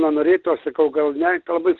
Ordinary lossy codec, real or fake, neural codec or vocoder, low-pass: Opus, 16 kbps; real; none; 7.2 kHz